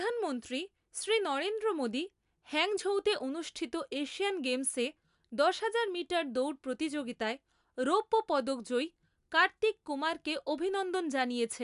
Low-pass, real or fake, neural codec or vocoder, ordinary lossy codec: 10.8 kHz; real; none; AAC, 96 kbps